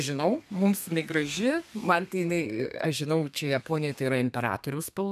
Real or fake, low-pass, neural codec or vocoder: fake; 14.4 kHz; codec, 32 kHz, 1.9 kbps, SNAC